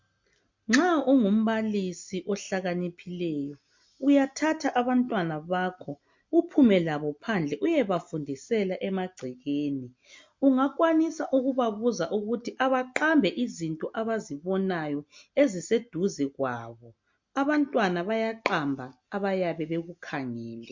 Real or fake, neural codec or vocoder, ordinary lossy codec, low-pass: real; none; MP3, 48 kbps; 7.2 kHz